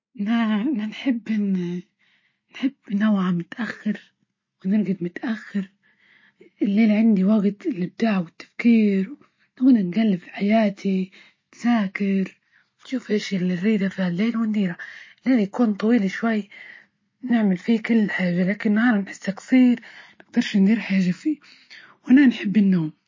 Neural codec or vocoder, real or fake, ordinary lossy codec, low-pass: none; real; MP3, 32 kbps; 7.2 kHz